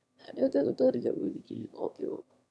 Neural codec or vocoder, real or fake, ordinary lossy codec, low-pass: autoencoder, 22.05 kHz, a latent of 192 numbers a frame, VITS, trained on one speaker; fake; none; none